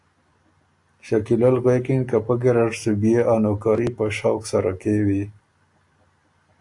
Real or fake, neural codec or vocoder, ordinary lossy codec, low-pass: real; none; AAC, 64 kbps; 10.8 kHz